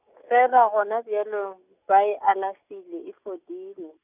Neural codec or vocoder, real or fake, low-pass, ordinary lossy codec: none; real; 3.6 kHz; none